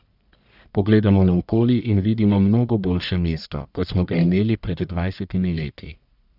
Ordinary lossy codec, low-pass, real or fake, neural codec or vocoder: none; 5.4 kHz; fake; codec, 44.1 kHz, 1.7 kbps, Pupu-Codec